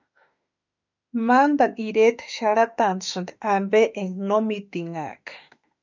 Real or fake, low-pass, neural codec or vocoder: fake; 7.2 kHz; autoencoder, 48 kHz, 32 numbers a frame, DAC-VAE, trained on Japanese speech